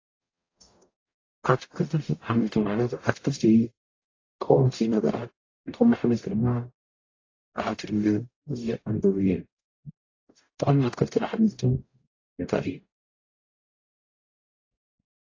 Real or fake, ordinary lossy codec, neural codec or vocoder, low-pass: fake; AAC, 32 kbps; codec, 44.1 kHz, 0.9 kbps, DAC; 7.2 kHz